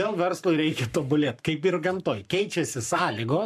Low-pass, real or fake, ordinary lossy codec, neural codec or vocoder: 14.4 kHz; fake; MP3, 96 kbps; codec, 44.1 kHz, 7.8 kbps, Pupu-Codec